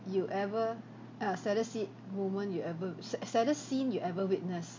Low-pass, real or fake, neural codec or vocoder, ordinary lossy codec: 7.2 kHz; real; none; MP3, 48 kbps